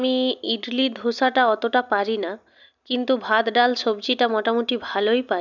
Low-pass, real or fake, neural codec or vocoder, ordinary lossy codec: 7.2 kHz; real; none; none